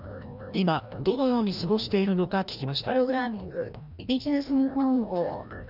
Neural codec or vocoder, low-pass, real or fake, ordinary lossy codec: codec, 16 kHz, 1 kbps, FreqCodec, larger model; 5.4 kHz; fake; none